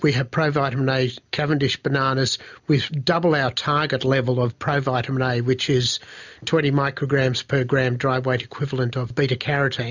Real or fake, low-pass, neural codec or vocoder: real; 7.2 kHz; none